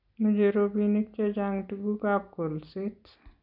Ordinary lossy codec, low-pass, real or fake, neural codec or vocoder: none; 5.4 kHz; real; none